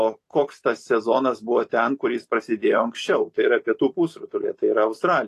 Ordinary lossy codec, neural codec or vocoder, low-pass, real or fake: AAC, 48 kbps; vocoder, 44.1 kHz, 128 mel bands every 256 samples, BigVGAN v2; 14.4 kHz; fake